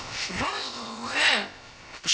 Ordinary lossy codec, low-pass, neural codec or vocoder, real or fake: none; none; codec, 16 kHz, about 1 kbps, DyCAST, with the encoder's durations; fake